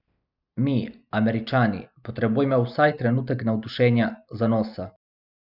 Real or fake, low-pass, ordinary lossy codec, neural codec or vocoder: real; 5.4 kHz; none; none